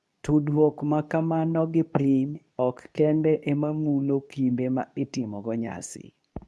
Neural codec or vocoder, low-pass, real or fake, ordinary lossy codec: codec, 24 kHz, 0.9 kbps, WavTokenizer, medium speech release version 2; none; fake; none